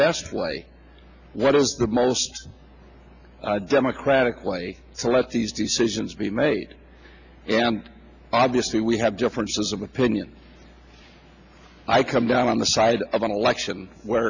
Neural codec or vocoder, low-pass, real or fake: none; 7.2 kHz; real